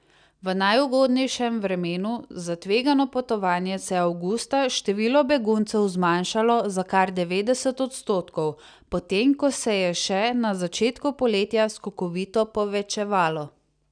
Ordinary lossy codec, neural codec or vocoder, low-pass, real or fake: none; none; 9.9 kHz; real